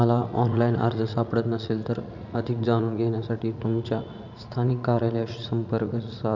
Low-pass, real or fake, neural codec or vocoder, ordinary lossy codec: 7.2 kHz; fake; vocoder, 44.1 kHz, 80 mel bands, Vocos; none